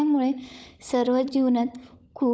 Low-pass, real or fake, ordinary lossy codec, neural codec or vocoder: none; fake; none; codec, 16 kHz, 16 kbps, FunCodec, trained on LibriTTS, 50 frames a second